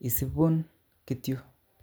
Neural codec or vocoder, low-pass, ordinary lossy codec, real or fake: none; none; none; real